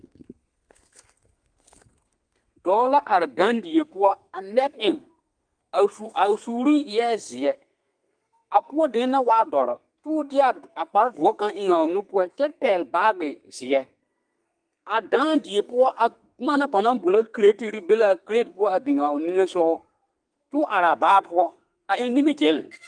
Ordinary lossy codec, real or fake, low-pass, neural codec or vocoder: Opus, 32 kbps; fake; 9.9 kHz; codec, 32 kHz, 1.9 kbps, SNAC